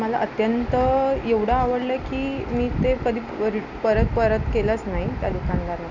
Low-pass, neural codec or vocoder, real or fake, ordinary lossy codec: 7.2 kHz; none; real; none